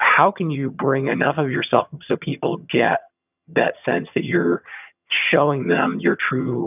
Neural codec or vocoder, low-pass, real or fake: vocoder, 22.05 kHz, 80 mel bands, HiFi-GAN; 3.6 kHz; fake